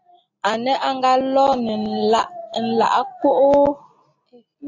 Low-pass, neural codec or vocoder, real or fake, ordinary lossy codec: 7.2 kHz; none; real; AAC, 48 kbps